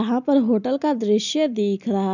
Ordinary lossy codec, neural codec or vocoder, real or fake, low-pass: none; none; real; 7.2 kHz